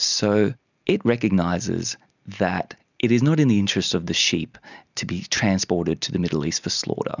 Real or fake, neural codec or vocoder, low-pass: real; none; 7.2 kHz